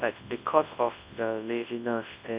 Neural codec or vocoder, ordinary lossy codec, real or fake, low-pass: codec, 24 kHz, 0.9 kbps, WavTokenizer, large speech release; Opus, 64 kbps; fake; 3.6 kHz